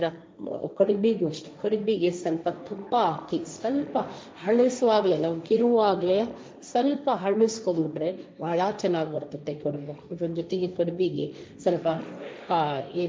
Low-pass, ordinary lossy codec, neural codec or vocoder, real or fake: none; none; codec, 16 kHz, 1.1 kbps, Voila-Tokenizer; fake